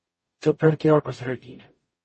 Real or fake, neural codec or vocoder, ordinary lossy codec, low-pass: fake; codec, 44.1 kHz, 0.9 kbps, DAC; MP3, 32 kbps; 10.8 kHz